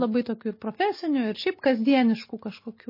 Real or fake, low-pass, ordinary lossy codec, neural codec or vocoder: real; 5.4 kHz; MP3, 24 kbps; none